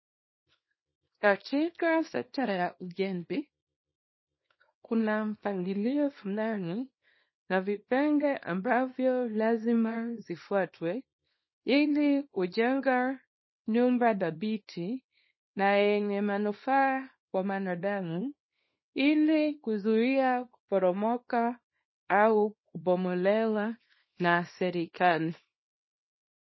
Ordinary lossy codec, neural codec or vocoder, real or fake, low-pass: MP3, 24 kbps; codec, 24 kHz, 0.9 kbps, WavTokenizer, small release; fake; 7.2 kHz